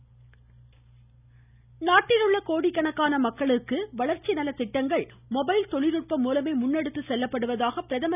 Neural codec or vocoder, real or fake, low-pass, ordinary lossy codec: none; real; 3.6 kHz; none